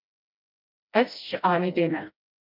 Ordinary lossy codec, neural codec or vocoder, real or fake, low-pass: MP3, 48 kbps; codec, 16 kHz, 1 kbps, FreqCodec, smaller model; fake; 5.4 kHz